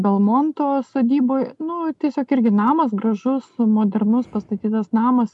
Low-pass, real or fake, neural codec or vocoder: 10.8 kHz; real; none